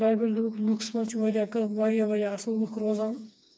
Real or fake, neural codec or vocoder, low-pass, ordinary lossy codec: fake; codec, 16 kHz, 2 kbps, FreqCodec, smaller model; none; none